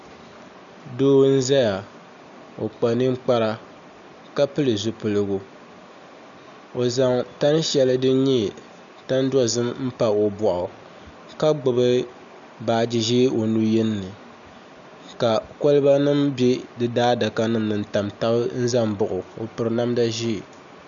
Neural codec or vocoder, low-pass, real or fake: none; 7.2 kHz; real